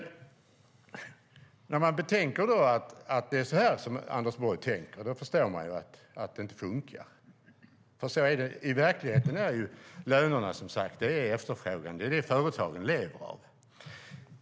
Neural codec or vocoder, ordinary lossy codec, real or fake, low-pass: none; none; real; none